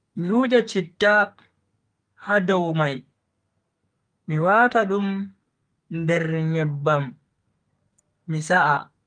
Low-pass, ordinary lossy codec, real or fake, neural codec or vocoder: 9.9 kHz; Opus, 32 kbps; fake; codec, 32 kHz, 1.9 kbps, SNAC